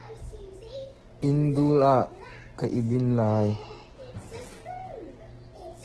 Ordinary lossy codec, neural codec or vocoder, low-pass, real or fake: Opus, 16 kbps; codec, 44.1 kHz, 7.8 kbps, DAC; 10.8 kHz; fake